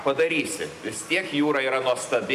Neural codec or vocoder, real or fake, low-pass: none; real; 14.4 kHz